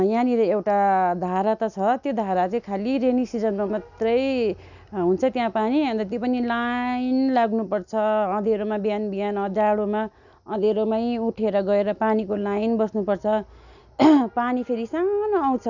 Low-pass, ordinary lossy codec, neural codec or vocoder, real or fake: 7.2 kHz; none; none; real